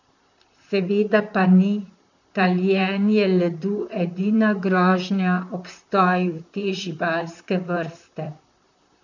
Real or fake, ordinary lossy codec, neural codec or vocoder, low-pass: fake; none; vocoder, 44.1 kHz, 128 mel bands, Pupu-Vocoder; 7.2 kHz